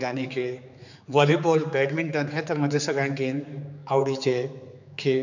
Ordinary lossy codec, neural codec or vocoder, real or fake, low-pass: none; codec, 16 kHz, 4 kbps, X-Codec, HuBERT features, trained on general audio; fake; 7.2 kHz